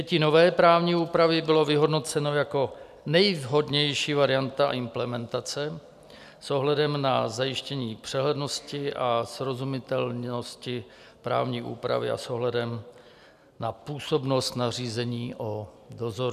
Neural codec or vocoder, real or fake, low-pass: none; real; 14.4 kHz